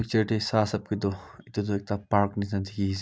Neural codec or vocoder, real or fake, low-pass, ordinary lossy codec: none; real; none; none